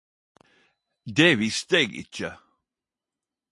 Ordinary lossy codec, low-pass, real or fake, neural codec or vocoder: MP3, 48 kbps; 10.8 kHz; real; none